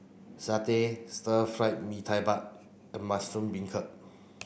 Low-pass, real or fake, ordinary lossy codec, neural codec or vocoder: none; real; none; none